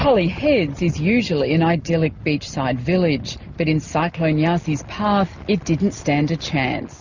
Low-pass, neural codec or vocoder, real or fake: 7.2 kHz; none; real